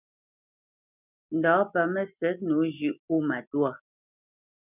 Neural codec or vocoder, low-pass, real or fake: none; 3.6 kHz; real